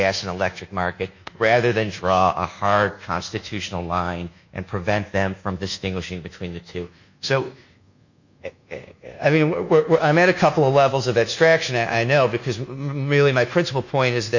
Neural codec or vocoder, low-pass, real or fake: codec, 24 kHz, 1.2 kbps, DualCodec; 7.2 kHz; fake